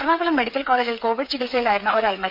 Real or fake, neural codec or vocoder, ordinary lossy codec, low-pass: fake; codec, 16 kHz, 8 kbps, FreqCodec, smaller model; none; 5.4 kHz